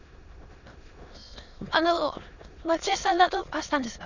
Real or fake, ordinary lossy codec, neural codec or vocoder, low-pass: fake; none; autoencoder, 22.05 kHz, a latent of 192 numbers a frame, VITS, trained on many speakers; 7.2 kHz